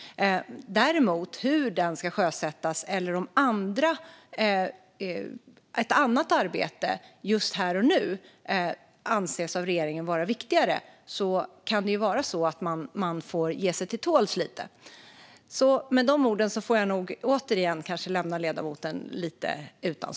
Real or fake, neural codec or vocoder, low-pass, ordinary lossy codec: real; none; none; none